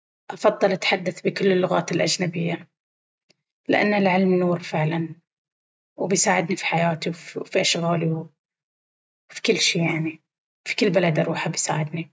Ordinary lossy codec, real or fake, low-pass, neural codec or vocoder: none; real; none; none